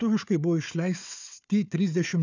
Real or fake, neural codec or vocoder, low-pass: fake; codec, 16 kHz, 4 kbps, FunCodec, trained on Chinese and English, 50 frames a second; 7.2 kHz